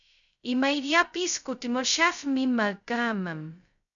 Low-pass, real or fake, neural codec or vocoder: 7.2 kHz; fake; codec, 16 kHz, 0.2 kbps, FocalCodec